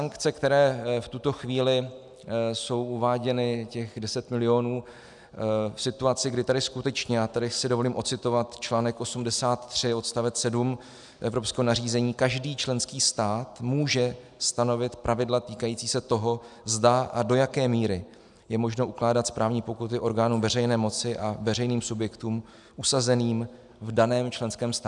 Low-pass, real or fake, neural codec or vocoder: 10.8 kHz; real; none